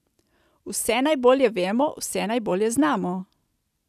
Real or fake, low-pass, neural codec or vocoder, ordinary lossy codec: real; 14.4 kHz; none; none